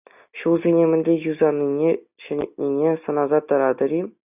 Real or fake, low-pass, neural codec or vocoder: real; 3.6 kHz; none